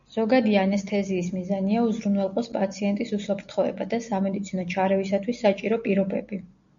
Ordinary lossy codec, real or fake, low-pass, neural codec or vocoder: MP3, 64 kbps; real; 7.2 kHz; none